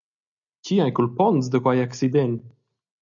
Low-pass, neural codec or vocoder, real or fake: 7.2 kHz; none; real